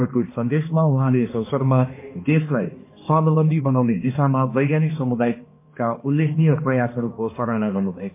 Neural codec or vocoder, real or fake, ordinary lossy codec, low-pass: codec, 16 kHz, 2 kbps, X-Codec, HuBERT features, trained on balanced general audio; fake; MP3, 24 kbps; 3.6 kHz